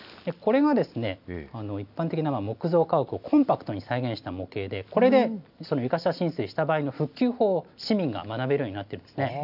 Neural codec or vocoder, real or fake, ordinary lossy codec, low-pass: none; real; AAC, 48 kbps; 5.4 kHz